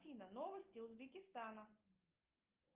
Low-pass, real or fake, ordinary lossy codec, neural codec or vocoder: 3.6 kHz; real; Opus, 32 kbps; none